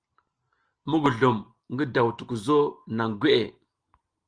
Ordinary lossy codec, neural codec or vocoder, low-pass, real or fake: Opus, 24 kbps; none; 9.9 kHz; real